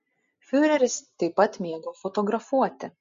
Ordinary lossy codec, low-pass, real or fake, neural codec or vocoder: MP3, 48 kbps; 7.2 kHz; real; none